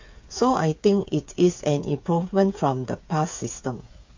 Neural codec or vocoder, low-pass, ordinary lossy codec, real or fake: codec, 16 kHz, 8 kbps, FreqCodec, smaller model; 7.2 kHz; MP3, 48 kbps; fake